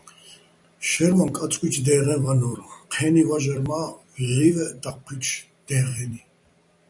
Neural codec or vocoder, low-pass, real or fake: vocoder, 44.1 kHz, 128 mel bands every 256 samples, BigVGAN v2; 10.8 kHz; fake